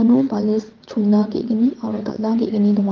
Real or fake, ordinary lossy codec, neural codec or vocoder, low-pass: fake; Opus, 32 kbps; vocoder, 22.05 kHz, 80 mel bands, WaveNeXt; 7.2 kHz